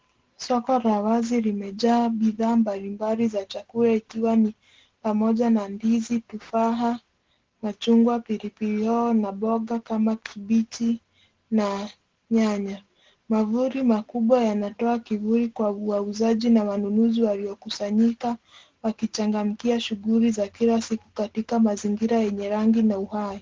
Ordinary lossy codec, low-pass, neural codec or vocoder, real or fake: Opus, 16 kbps; 7.2 kHz; none; real